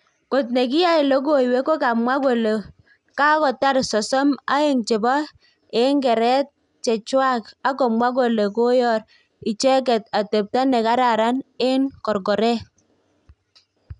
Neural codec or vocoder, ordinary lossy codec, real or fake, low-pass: none; none; real; 10.8 kHz